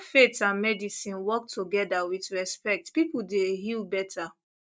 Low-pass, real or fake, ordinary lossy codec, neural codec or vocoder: none; real; none; none